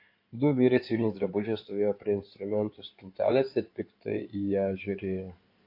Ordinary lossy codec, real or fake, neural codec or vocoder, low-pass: AAC, 32 kbps; fake; codec, 16 kHz in and 24 kHz out, 2.2 kbps, FireRedTTS-2 codec; 5.4 kHz